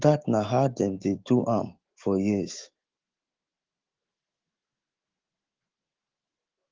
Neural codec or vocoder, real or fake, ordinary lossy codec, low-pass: autoencoder, 48 kHz, 128 numbers a frame, DAC-VAE, trained on Japanese speech; fake; Opus, 16 kbps; 7.2 kHz